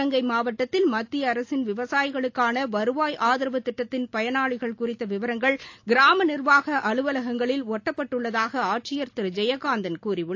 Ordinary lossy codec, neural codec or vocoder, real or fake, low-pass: AAC, 48 kbps; none; real; 7.2 kHz